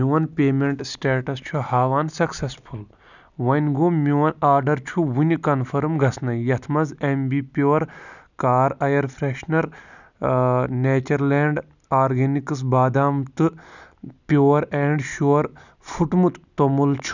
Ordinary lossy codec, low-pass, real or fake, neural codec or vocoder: none; 7.2 kHz; real; none